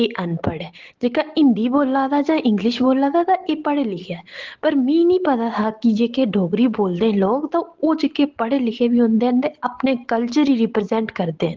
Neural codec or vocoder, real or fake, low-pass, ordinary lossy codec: none; real; 7.2 kHz; Opus, 16 kbps